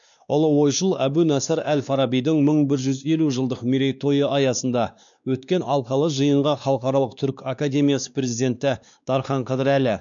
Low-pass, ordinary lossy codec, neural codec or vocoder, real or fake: 7.2 kHz; none; codec, 16 kHz, 2 kbps, X-Codec, WavLM features, trained on Multilingual LibriSpeech; fake